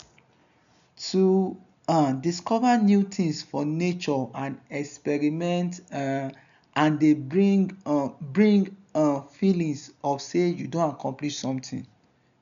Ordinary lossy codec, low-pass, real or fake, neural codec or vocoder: none; 7.2 kHz; real; none